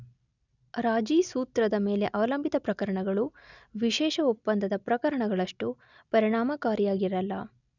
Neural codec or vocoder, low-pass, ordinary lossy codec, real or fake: none; 7.2 kHz; none; real